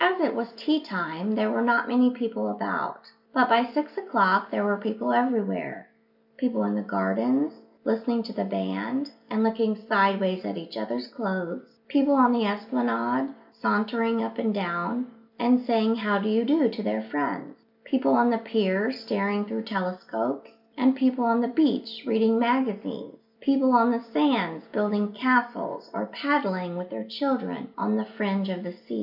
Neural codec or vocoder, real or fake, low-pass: none; real; 5.4 kHz